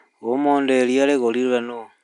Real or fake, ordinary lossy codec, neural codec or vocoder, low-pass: real; none; none; 10.8 kHz